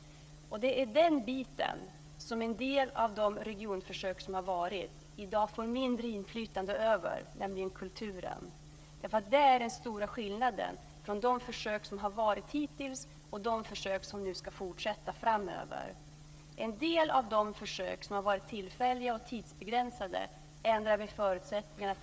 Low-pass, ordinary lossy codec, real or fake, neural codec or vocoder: none; none; fake; codec, 16 kHz, 16 kbps, FreqCodec, smaller model